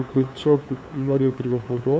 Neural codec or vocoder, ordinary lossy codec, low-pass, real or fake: codec, 16 kHz, 2 kbps, FunCodec, trained on LibriTTS, 25 frames a second; none; none; fake